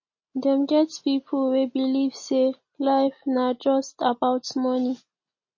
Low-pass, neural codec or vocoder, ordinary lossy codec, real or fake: 7.2 kHz; none; MP3, 32 kbps; real